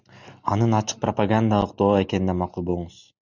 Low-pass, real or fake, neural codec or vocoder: 7.2 kHz; real; none